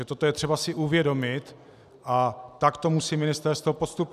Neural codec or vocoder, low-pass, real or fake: none; 14.4 kHz; real